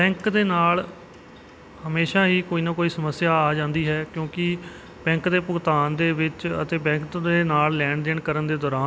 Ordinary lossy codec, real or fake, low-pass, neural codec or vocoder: none; real; none; none